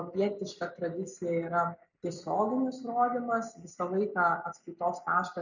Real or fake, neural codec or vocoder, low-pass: real; none; 7.2 kHz